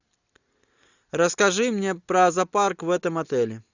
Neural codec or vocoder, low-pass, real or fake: none; 7.2 kHz; real